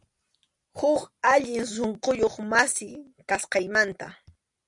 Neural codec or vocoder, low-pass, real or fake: none; 10.8 kHz; real